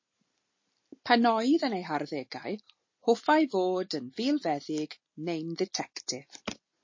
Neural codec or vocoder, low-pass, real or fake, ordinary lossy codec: none; 7.2 kHz; real; MP3, 32 kbps